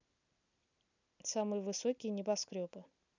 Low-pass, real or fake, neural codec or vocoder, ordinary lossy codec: 7.2 kHz; real; none; none